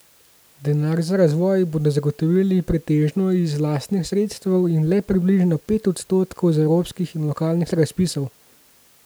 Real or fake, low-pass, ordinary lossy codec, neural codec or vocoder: real; none; none; none